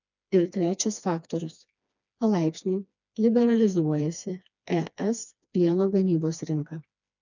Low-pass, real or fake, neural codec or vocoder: 7.2 kHz; fake; codec, 16 kHz, 2 kbps, FreqCodec, smaller model